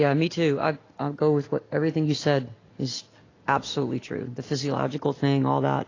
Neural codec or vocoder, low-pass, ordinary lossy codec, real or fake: none; 7.2 kHz; AAC, 32 kbps; real